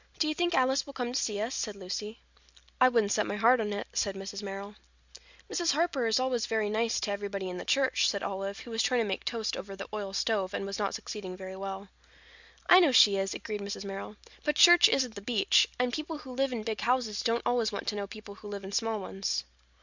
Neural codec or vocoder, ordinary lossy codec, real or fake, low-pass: none; Opus, 64 kbps; real; 7.2 kHz